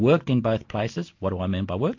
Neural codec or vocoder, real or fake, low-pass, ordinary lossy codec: none; real; 7.2 kHz; MP3, 48 kbps